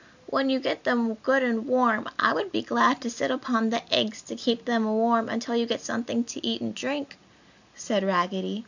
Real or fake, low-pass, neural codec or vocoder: real; 7.2 kHz; none